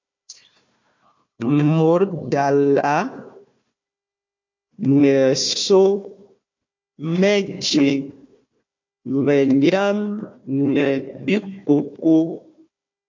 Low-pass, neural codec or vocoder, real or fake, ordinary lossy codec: 7.2 kHz; codec, 16 kHz, 1 kbps, FunCodec, trained on Chinese and English, 50 frames a second; fake; MP3, 48 kbps